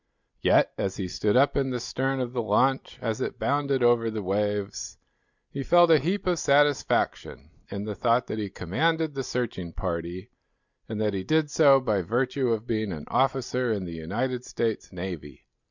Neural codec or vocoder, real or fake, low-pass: none; real; 7.2 kHz